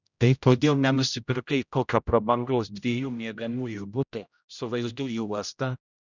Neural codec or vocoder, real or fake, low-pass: codec, 16 kHz, 0.5 kbps, X-Codec, HuBERT features, trained on general audio; fake; 7.2 kHz